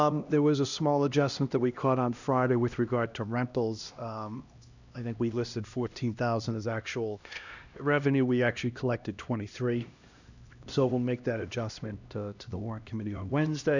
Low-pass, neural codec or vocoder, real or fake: 7.2 kHz; codec, 16 kHz, 1 kbps, X-Codec, HuBERT features, trained on LibriSpeech; fake